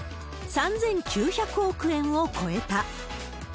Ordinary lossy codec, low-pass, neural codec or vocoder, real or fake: none; none; none; real